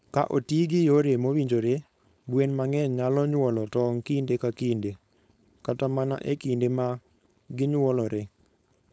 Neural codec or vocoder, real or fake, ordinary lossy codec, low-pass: codec, 16 kHz, 4.8 kbps, FACodec; fake; none; none